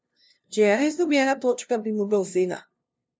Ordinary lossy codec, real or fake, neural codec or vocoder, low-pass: none; fake; codec, 16 kHz, 0.5 kbps, FunCodec, trained on LibriTTS, 25 frames a second; none